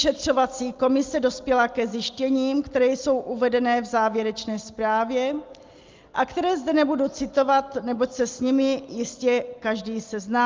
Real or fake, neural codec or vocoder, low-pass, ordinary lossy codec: real; none; 7.2 kHz; Opus, 32 kbps